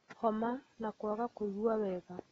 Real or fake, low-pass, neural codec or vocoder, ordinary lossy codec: real; 10.8 kHz; none; AAC, 24 kbps